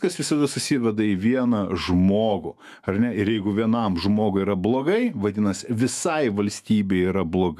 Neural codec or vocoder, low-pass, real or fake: autoencoder, 48 kHz, 128 numbers a frame, DAC-VAE, trained on Japanese speech; 14.4 kHz; fake